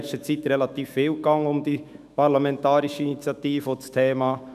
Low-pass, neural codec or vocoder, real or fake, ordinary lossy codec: 14.4 kHz; autoencoder, 48 kHz, 128 numbers a frame, DAC-VAE, trained on Japanese speech; fake; none